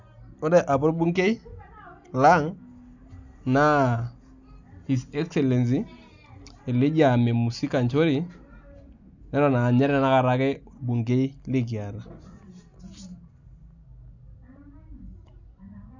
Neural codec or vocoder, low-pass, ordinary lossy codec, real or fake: none; 7.2 kHz; none; real